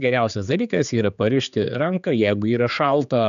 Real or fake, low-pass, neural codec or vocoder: fake; 7.2 kHz; codec, 16 kHz, 4 kbps, X-Codec, HuBERT features, trained on general audio